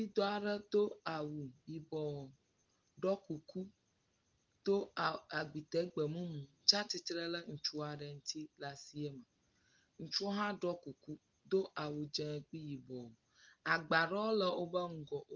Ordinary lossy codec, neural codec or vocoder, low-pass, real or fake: Opus, 32 kbps; none; 7.2 kHz; real